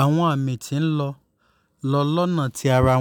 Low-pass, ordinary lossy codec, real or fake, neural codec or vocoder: 19.8 kHz; none; real; none